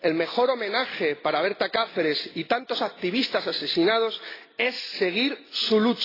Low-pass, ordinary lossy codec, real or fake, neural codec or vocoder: 5.4 kHz; AAC, 24 kbps; real; none